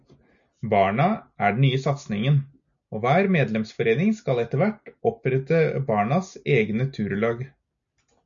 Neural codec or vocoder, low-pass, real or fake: none; 7.2 kHz; real